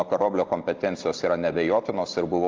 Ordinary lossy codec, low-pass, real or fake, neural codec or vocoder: Opus, 32 kbps; 7.2 kHz; real; none